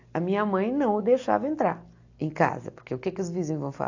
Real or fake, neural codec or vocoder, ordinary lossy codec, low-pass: real; none; AAC, 48 kbps; 7.2 kHz